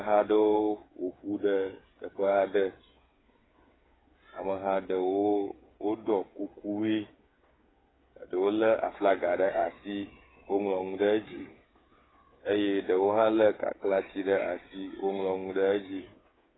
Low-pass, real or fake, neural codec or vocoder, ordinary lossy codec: 7.2 kHz; fake; codec, 16 kHz, 8 kbps, FreqCodec, smaller model; AAC, 16 kbps